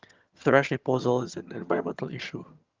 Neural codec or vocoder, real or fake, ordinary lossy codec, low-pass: vocoder, 22.05 kHz, 80 mel bands, HiFi-GAN; fake; Opus, 24 kbps; 7.2 kHz